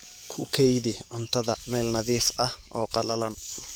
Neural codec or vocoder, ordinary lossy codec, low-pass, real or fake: codec, 44.1 kHz, 7.8 kbps, DAC; none; none; fake